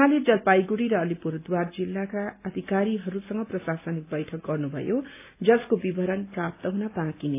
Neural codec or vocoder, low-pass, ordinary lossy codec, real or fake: none; 3.6 kHz; AAC, 24 kbps; real